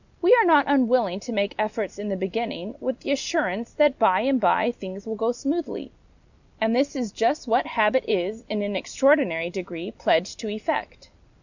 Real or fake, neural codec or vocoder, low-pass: real; none; 7.2 kHz